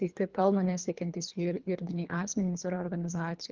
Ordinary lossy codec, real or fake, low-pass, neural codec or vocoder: Opus, 32 kbps; fake; 7.2 kHz; codec, 24 kHz, 3 kbps, HILCodec